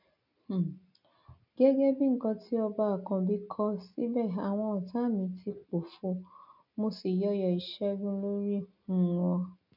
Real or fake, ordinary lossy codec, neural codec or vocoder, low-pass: real; none; none; 5.4 kHz